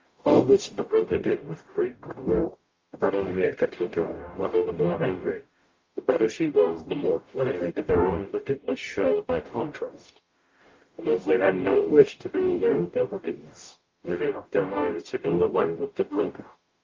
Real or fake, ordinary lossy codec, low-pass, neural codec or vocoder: fake; Opus, 32 kbps; 7.2 kHz; codec, 44.1 kHz, 0.9 kbps, DAC